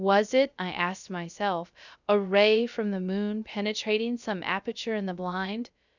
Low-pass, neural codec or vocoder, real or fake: 7.2 kHz; codec, 16 kHz, 0.3 kbps, FocalCodec; fake